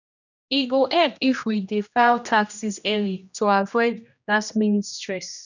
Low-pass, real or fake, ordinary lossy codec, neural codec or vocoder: 7.2 kHz; fake; none; codec, 16 kHz, 1 kbps, X-Codec, HuBERT features, trained on general audio